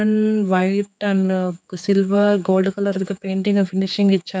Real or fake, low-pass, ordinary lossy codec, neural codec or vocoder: fake; none; none; codec, 16 kHz, 4 kbps, X-Codec, HuBERT features, trained on general audio